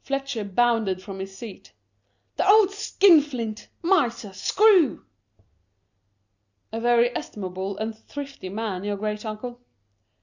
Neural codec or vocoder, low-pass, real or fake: none; 7.2 kHz; real